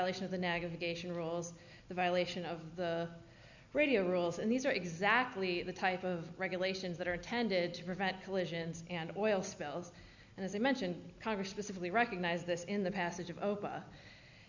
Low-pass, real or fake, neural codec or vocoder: 7.2 kHz; real; none